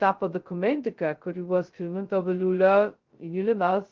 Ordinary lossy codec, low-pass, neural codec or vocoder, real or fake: Opus, 16 kbps; 7.2 kHz; codec, 16 kHz, 0.2 kbps, FocalCodec; fake